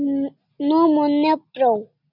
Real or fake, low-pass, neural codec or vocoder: real; 5.4 kHz; none